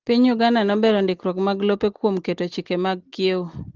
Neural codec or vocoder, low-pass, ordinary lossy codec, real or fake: none; 7.2 kHz; Opus, 16 kbps; real